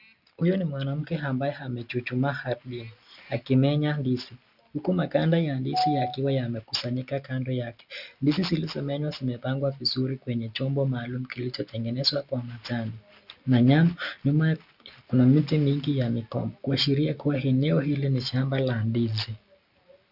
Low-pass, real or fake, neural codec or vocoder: 5.4 kHz; real; none